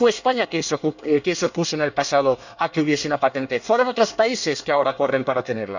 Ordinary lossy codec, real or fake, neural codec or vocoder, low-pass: none; fake; codec, 24 kHz, 1 kbps, SNAC; 7.2 kHz